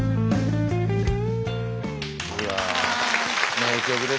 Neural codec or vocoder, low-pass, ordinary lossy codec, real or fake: none; none; none; real